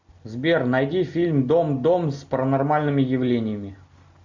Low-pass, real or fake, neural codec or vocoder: 7.2 kHz; real; none